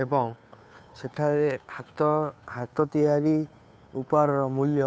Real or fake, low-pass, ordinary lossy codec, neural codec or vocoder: fake; none; none; codec, 16 kHz, 2 kbps, FunCodec, trained on Chinese and English, 25 frames a second